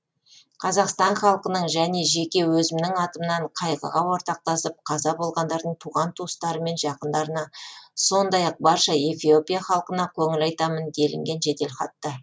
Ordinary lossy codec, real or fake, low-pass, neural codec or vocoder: none; real; none; none